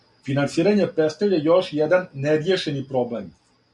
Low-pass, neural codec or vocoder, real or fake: 10.8 kHz; none; real